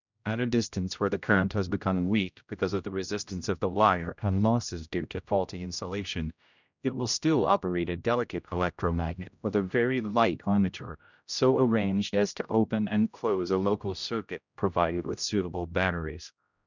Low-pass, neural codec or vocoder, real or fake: 7.2 kHz; codec, 16 kHz, 0.5 kbps, X-Codec, HuBERT features, trained on general audio; fake